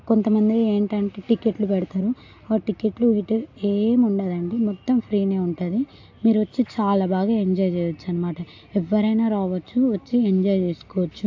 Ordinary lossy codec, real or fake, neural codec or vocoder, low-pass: AAC, 48 kbps; real; none; 7.2 kHz